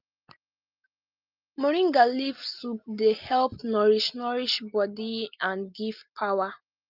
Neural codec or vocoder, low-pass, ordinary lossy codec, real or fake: none; 5.4 kHz; Opus, 24 kbps; real